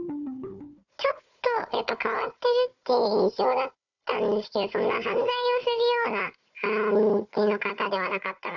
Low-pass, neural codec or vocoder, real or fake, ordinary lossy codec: 7.2 kHz; codec, 16 kHz, 4 kbps, FunCodec, trained on Chinese and English, 50 frames a second; fake; none